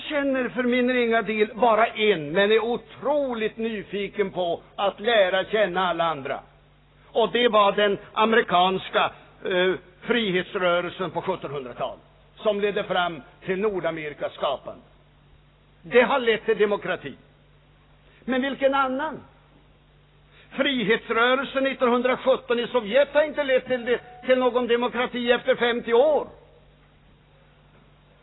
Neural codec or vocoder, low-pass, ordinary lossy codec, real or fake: none; 7.2 kHz; AAC, 16 kbps; real